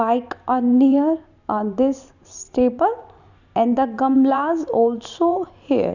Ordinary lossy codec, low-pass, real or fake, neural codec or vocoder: none; 7.2 kHz; fake; vocoder, 44.1 kHz, 128 mel bands every 512 samples, BigVGAN v2